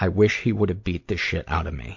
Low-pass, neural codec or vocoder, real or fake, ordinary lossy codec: 7.2 kHz; none; real; MP3, 48 kbps